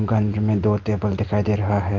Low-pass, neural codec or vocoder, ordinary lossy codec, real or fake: 7.2 kHz; none; Opus, 24 kbps; real